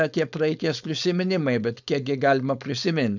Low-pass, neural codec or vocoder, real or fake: 7.2 kHz; codec, 16 kHz, 4.8 kbps, FACodec; fake